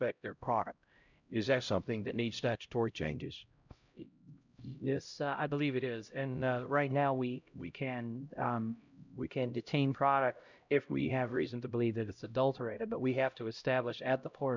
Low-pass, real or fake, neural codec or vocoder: 7.2 kHz; fake; codec, 16 kHz, 0.5 kbps, X-Codec, HuBERT features, trained on LibriSpeech